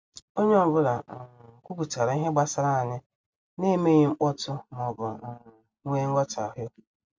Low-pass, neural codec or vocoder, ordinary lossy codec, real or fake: none; none; none; real